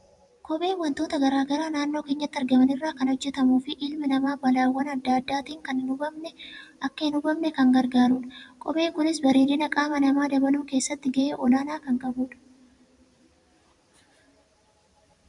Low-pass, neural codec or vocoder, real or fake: 10.8 kHz; vocoder, 44.1 kHz, 128 mel bands every 512 samples, BigVGAN v2; fake